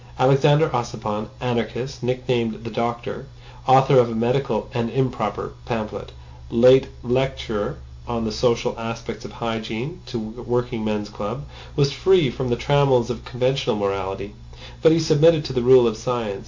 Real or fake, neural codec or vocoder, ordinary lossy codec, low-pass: real; none; MP3, 48 kbps; 7.2 kHz